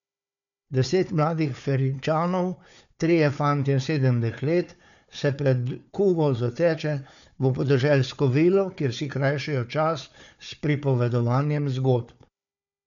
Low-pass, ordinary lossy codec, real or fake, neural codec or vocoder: 7.2 kHz; none; fake; codec, 16 kHz, 4 kbps, FunCodec, trained on Chinese and English, 50 frames a second